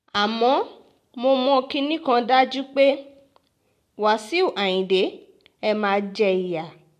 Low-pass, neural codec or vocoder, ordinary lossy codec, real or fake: 14.4 kHz; none; MP3, 64 kbps; real